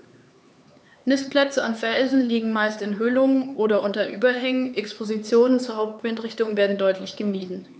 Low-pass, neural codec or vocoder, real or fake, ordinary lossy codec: none; codec, 16 kHz, 4 kbps, X-Codec, HuBERT features, trained on LibriSpeech; fake; none